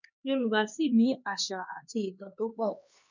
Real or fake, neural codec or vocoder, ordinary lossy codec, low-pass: fake; codec, 16 kHz, 2 kbps, X-Codec, HuBERT features, trained on LibriSpeech; none; 7.2 kHz